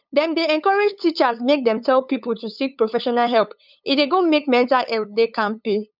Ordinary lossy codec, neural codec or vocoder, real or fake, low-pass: none; codec, 16 kHz, 8 kbps, FunCodec, trained on LibriTTS, 25 frames a second; fake; 5.4 kHz